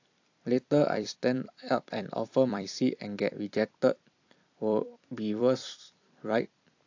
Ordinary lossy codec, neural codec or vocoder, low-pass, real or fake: AAC, 48 kbps; vocoder, 44.1 kHz, 128 mel bands every 256 samples, BigVGAN v2; 7.2 kHz; fake